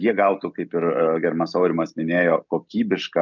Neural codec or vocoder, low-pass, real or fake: none; 7.2 kHz; real